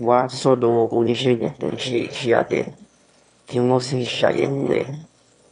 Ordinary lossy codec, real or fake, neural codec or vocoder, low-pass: none; fake; autoencoder, 22.05 kHz, a latent of 192 numbers a frame, VITS, trained on one speaker; 9.9 kHz